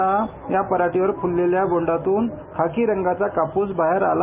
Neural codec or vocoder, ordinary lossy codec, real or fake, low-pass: none; none; real; 3.6 kHz